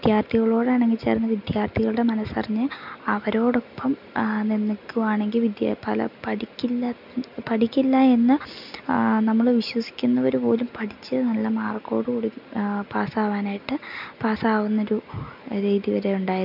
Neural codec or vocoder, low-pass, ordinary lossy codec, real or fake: none; 5.4 kHz; none; real